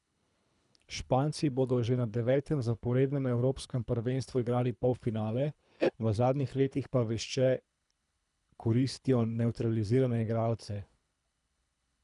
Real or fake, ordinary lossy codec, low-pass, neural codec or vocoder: fake; none; 10.8 kHz; codec, 24 kHz, 3 kbps, HILCodec